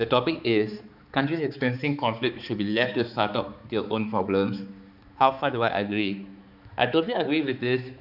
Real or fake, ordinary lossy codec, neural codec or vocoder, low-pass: fake; none; codec, 16 kHz, 4 kbps, X-Codec, HuBERT features, trained on balanced general audio; 5.4 kHz